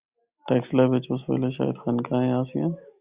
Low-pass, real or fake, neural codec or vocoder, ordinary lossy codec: 3.6 kHz; real; none; Opus, 64 kbps